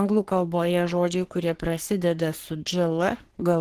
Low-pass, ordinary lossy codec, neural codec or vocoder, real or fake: 14.4 kHz; Opus, 16 kbps; codec, 44.1 kHz, 3.4 kbps, Pupu-Codec; fake